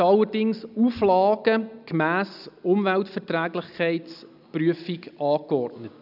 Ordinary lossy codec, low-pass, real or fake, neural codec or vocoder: none; 5.4 kHz; real; none